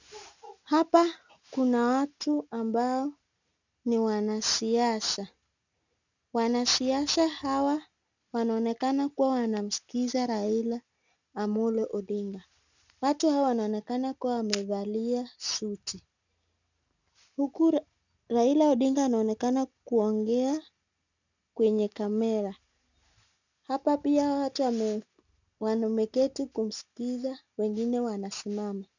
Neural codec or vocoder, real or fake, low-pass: none; real; 7.2 kHz